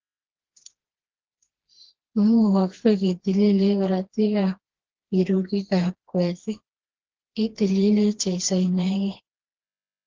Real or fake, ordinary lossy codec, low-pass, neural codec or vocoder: fake; Opus, 16 kbps; 7.2 kHz; codec, 16 kHz, 2 kbps, FreqCodec, smaller model